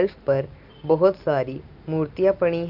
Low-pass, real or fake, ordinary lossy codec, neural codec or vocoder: 5.4 kHz; real; Opus, 32 kbps; none